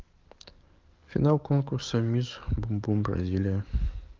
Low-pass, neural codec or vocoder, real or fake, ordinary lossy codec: 7.2 kHz; codec, 44.1 kHz, 7.8 kbps, DAC; fake; Opus, 32 kbps